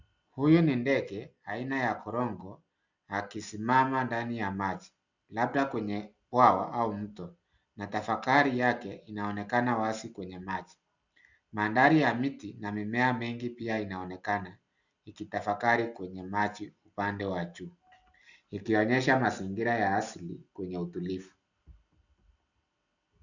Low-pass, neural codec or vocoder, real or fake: 7.2 kHz; none; real